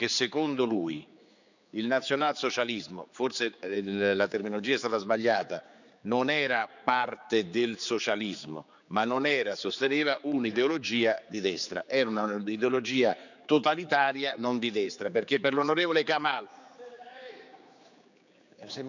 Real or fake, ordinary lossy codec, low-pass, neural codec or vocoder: fake; none; 7.2 kHz; codec, 16 kHz, 4 kbps, X-Codec, HuBERT features, trained on general audio